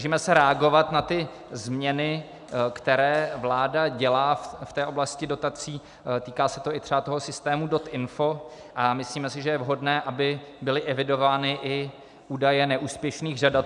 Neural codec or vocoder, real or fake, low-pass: none; real; 10.8 kHz